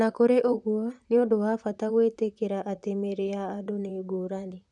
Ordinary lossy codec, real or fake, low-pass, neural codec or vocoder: none; fake; 10.8 kHz; vocoder, 44.1 kHz, 128 mel bands, Pupu-Vocoder